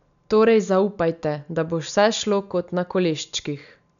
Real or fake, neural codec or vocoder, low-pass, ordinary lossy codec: real; none; 7.2 kHz; none